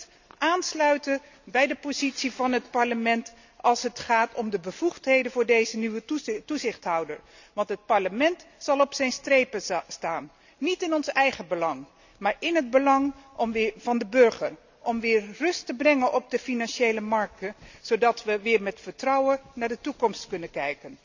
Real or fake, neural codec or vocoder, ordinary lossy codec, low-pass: real; none; none; 7.2 kHz